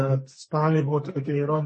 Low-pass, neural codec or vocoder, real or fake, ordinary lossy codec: 10.8 kHz; codec, 32 kHz, 1.9 kbps, SNAC; fake; MP3, 32 kbps